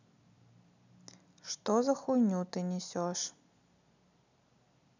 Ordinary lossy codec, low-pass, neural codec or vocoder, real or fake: none; 7.2 kHz; none; real